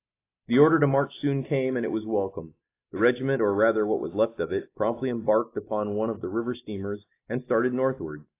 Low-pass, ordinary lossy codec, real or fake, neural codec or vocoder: 3.6 kHz; AAC, 24 kbps; real; none